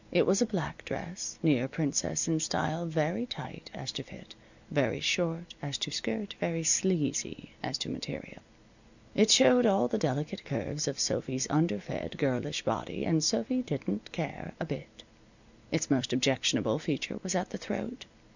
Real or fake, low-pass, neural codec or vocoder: real; 7.2 kHz; none